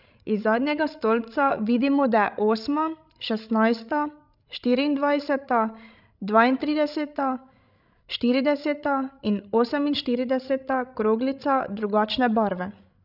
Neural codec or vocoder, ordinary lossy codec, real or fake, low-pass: codec, 16 kHz, 16 kbps, FreqCodec, larger model; none; fake; 5.4 kHz